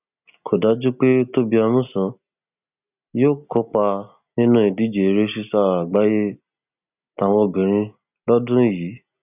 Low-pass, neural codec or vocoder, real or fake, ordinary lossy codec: 3.6 kHz; none; real; none